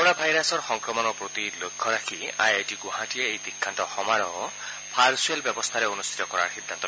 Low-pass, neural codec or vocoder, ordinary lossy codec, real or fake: none; none; none; real